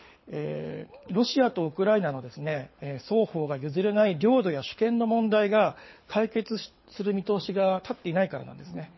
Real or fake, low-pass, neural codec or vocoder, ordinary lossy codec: fake; 7.2 kHz; codec, 24 kHz, 6 kbps, HILCodec; MP3, 24 kbps